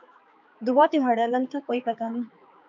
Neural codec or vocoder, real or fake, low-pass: codec, 16 kHz, 4 kbps, X-Codec, HuBERT features, trained on balanced general audio; fake; 7.2 kHz